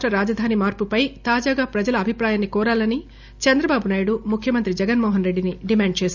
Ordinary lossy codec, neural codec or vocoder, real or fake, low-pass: none; none; real; 7.2 kHz